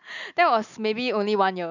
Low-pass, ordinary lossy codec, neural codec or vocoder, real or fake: 7.2 kHz; none; none; real